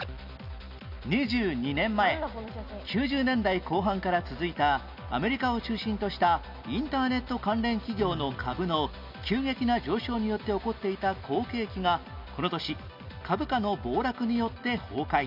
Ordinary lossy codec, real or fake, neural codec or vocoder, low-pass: none; real; none; 5.4 kHz